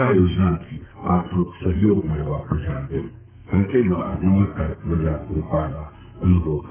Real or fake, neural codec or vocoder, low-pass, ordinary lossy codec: fake; codec, 32 kHz, 1.9 kbps, SNAC; 3.6 kHz; none